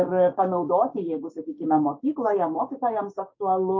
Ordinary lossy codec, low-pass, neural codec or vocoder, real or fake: MP3, 32 kbps; 7.2 kHz; codec, 16 kHz, 6 kbps, DAC; fake